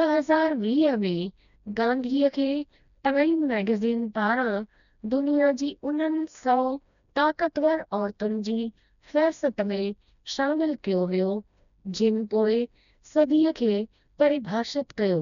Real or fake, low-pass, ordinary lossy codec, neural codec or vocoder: fake; 7.2 kHz; none; codec, 16 kHz, 1 kbps, FreqCodec, smaller model